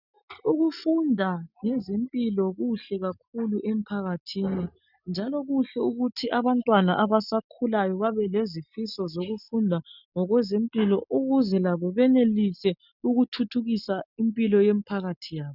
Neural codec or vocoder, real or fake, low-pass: none; real; 5.4 kHz